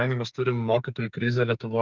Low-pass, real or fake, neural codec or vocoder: 7.2 kHz; fake; codec, 44.1 kHz, 2.6 kbps, SNAC